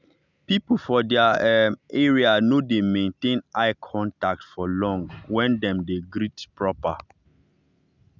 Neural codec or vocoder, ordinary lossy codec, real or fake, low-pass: none; none; real; 7.2 kHz